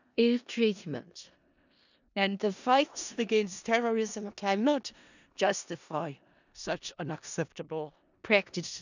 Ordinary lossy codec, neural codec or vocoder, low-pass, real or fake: none; codec, 16 kHz in and 24 kHz out, 0.4 kbps, LongCat-Audio-Codec, four codebook decoder; 7.2 kHz; fake